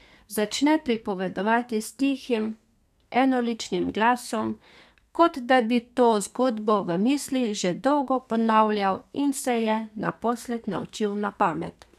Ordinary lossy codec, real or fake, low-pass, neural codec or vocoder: none; fake; 14.4 kHz; codec, 32 kHz, 1.9 kbps, SNAC